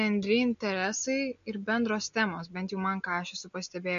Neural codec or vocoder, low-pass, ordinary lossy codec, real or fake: none; 7.2 kHz; MP3, 48 kbps; real